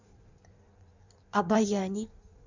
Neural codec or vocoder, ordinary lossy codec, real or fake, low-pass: codec, 16 kHz in and 24 kHz out, 1.1 kbps, FireRedTTS-2 codec; Opus, 64 kbps; fake; 7.2 kHz